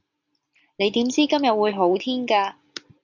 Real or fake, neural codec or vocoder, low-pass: real; none; 7.2 kHz